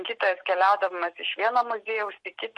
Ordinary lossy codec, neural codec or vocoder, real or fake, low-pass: MP3, 48 kbps; none; real; 10.8 kHz